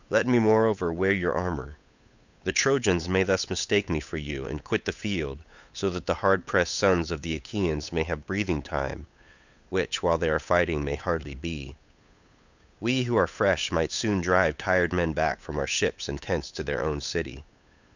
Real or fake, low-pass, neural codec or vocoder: fake; 7.2 kHz; codec, 16 kHz, 8 kbps, FunCodec, trained on Chinese and English, 25 frames a second